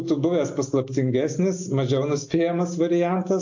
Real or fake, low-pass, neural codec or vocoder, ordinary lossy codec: real; 7.2 kHz; none; AAC, 48 kbps